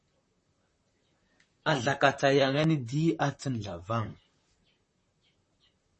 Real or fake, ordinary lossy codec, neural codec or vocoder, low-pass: fake; MP3, 32 kbps; vocoder, 44.1 kHz, 128 mel bands, Pupu-Vocoder; 10.8 kHz